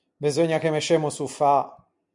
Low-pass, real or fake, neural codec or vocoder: 10.8 kHz; real; none